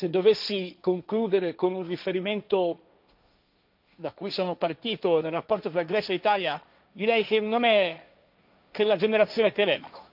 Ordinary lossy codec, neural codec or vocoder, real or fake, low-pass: none; codec, 16 kHz, 1.1 kbps, Voila-Tokenizer; fake; 5.4 kHz